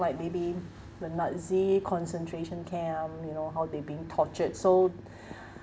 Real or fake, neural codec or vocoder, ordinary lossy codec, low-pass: real; none; none; none